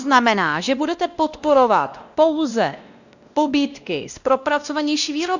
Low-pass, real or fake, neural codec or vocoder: 7.2 kHz; fake; codec, 16 kHz, 1 kbps, X-Codec, WavLM features, trained on Multilingual LibriSpeech